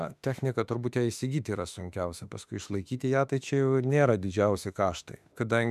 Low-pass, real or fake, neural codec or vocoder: 14.4 kHz; fake; autoencoder, 48 kHz, 32 numbers a frame, DAC-VAE, trained on Japanese speech